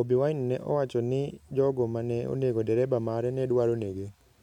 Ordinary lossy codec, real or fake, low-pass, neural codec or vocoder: none; real; 19.8 kHz; none